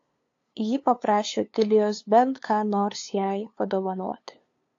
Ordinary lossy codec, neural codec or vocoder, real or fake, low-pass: AAC, 48 kbps; codec, 16 kHz, 2 kbps, FunCodec, trained on LibriTTS, 25 frames a second; fake; 7.2 kHz